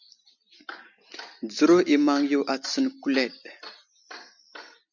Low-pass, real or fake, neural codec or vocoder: 7.2 kHz; real; none